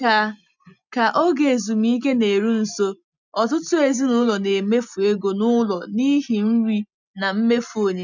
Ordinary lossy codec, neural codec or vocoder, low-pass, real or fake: none; none; 7.2 kHz; real